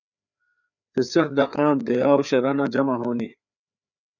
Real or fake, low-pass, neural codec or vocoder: fake; 7.2 kHz; codec, 16 kHz, 4 kbps, FreqCodec, larger model